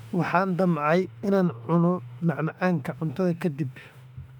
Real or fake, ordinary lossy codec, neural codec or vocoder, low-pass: fake; none; autoencoder, 48 kHz, 32 numbers a frame, DAC-VAE, trained on Japanese speech; 19.8 kHz